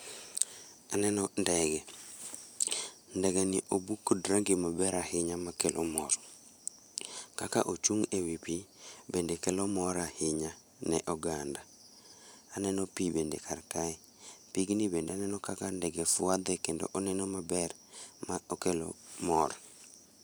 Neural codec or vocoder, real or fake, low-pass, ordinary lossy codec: vocoder, 44.1 kHz, 128 mel bands every 512 samples, BigVGAN v2; fake; none; none